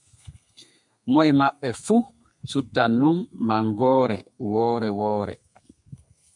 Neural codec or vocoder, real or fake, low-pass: codec, 32 kHz, 1.9 kbps, SNAC; fake; 10.8 kHz